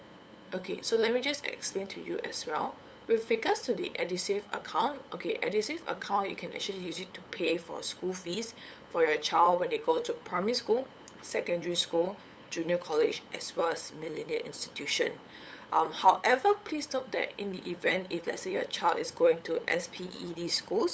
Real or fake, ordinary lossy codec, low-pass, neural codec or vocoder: fake; none; none; codec, 16 kHz, 8 kbps, FunCodec, trained on LibriTTS, 25 frames a second